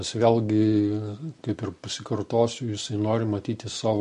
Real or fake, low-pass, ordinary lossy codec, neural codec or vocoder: real; 10.8 kHz; MP3, 48 kbps; none